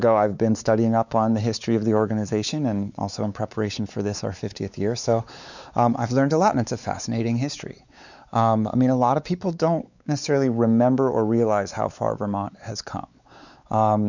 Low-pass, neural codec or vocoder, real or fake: 7.2 kHz; codec, 16 kHz, 4 kbps, X-Codec, WavLM features, trained on Multilingual LibriSpeech; fake